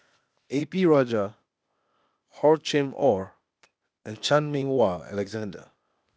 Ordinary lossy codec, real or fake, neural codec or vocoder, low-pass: none; fake; codec, 16 kHz, 0.8 kbps, ZipCodec; none